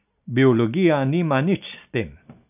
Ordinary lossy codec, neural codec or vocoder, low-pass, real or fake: none; none; 3.6 kHz; real